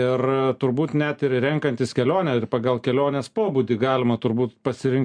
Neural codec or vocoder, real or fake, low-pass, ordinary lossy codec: none; real; 9.9 kHz; MP3, 64 kbps